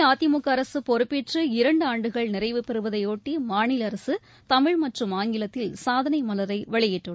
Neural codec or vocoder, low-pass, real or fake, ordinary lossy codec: none; none; real; none